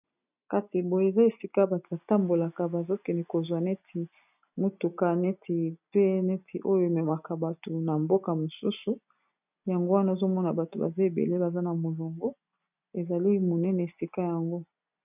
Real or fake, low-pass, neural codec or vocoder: real; 3.6 kHz; none